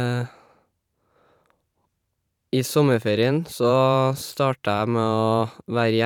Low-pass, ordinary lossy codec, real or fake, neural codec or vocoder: 19.8 kHz; none; fake; vocoder, 44.1 kHz, 128 mel bands every 512 samples, BigVGAN v2